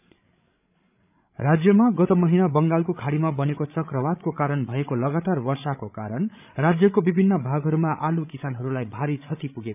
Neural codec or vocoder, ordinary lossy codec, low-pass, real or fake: codec, 16 kHz, 16 kbps, FreqCodec, larger model; none; 3.6 kHz; fake